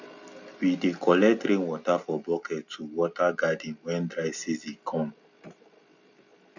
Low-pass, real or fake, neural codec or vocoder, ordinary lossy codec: 7.2 kHz; real; none; none